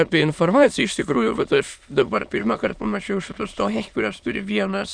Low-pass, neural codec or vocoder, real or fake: 9.9 kHz; autoencoder, 22.05 kHz, a latent of 192 numbers a frame, VITS, trained on many speakers; fake